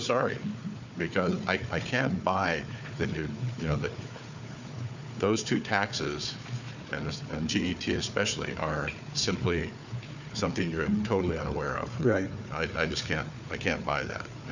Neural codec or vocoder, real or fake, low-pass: codec, 16 kHz, 4 kbps, FunCodec, trained on Chinese and English, 50 frames a second; fake; 7.2 kHz